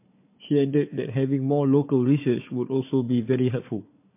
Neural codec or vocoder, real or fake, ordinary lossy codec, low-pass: codec, 16 kHz, 4 kbps, FunCodec, trained on Chinese and English, 50 frames a second; fake; MP3, 24 kbps; 3.6 kHz